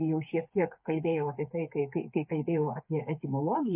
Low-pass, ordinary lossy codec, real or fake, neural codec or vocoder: 3.6 kHz; MP3, 24 kbps; fake; codec, 16 kHz, 8 kbps, FreqCodec, smaller model